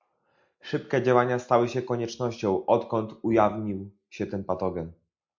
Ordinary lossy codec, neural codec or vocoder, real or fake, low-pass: MP3, 64 kbps; none; real; 7.2 kHz